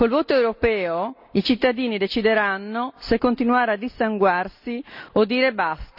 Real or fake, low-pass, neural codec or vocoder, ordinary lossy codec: real; 5.4 kHz; none; none